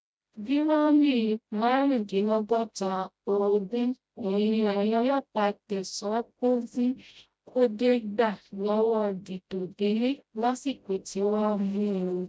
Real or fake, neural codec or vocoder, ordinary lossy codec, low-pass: fake; codec, 16 kHz, 0.5 kbps, FreqCodec, smaller model; none; none